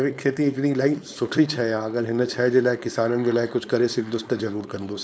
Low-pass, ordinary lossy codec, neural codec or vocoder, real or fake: none; none; codec, 16 kHz, 4.8 kbps, FACodec; fake